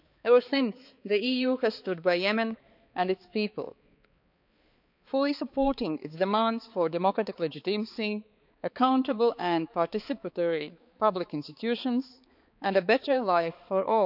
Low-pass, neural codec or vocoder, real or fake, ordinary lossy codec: 5.4 kHz; codec, 16 kHz, 4 kbps, X-Codec, HuBERT features, trained on balanced general audio; fake; none